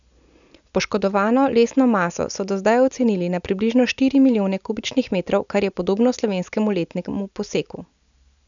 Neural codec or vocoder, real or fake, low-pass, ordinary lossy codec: none; real; 7.2 kHz; none